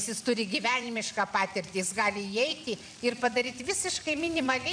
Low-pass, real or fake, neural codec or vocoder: 9.9 kHz; fake; vocoder, 44.1 kHz, 128 mel bands every 256 samples, BigVGAN v2